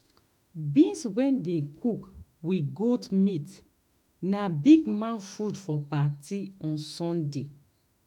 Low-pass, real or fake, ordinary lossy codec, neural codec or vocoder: 19.8 kHz; fake; none; autoencoder, 48 kHz, 32 numbers a frame, DAC-VAE, trained on Japanese speech